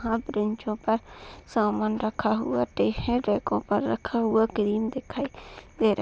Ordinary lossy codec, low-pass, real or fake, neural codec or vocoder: none; none; real; none